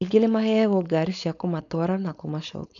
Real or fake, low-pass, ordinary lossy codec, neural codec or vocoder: fake; 7.2 kHz; none; codec, 16 kHz, 4.8 kbps, FACodec